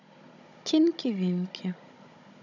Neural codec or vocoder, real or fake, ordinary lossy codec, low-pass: codec, 16 kHz, 16 kbps, FunCodec, trained on Chinese and English, 50 frames a second; fake; none; 7.2 kHz